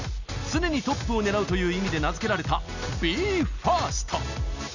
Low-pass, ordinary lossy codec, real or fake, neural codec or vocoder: 7.2 kHz; none; real; none